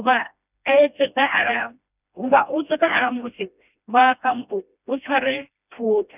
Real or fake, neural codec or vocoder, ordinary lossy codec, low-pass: fake; codec, 16 kHz, 1 kbps, FreqCodec, smaller model; none; 3.6 kHz